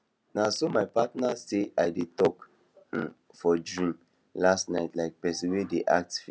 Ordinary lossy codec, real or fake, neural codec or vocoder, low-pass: none; real; none; none